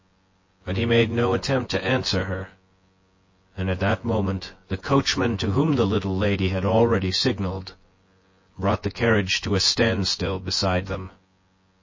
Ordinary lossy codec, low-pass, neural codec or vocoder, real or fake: MP3, 32 kbps; 7.2 kHz; vocoder, 24 kHz, 100 mel bands, Vocos; fake